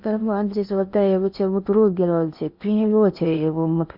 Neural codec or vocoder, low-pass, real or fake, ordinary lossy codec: codec, 16 kHz in and 24 kHz out, 0.8 kbps, FocalCodec, streaming, 65536 codes; 5.4 kHz; fake; Opus, 64 kbps